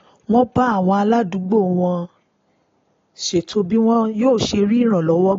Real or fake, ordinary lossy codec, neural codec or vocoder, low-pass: real; AAC, 32 kbps; none; 7.2 kHz